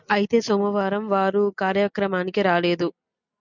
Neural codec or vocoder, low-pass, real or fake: none; 7.2 kHz; real